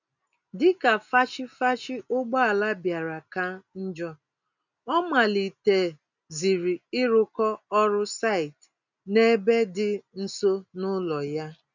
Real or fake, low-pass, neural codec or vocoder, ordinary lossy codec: real; 7.2 kHz; none; none